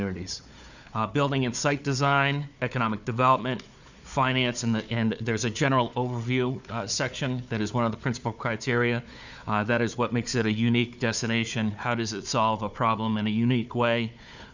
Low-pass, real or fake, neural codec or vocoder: 7.2 kHz; fake; codec, 16 kHz, 4 kbps, FunCodec, trained on Chinese and English, 50 frames a second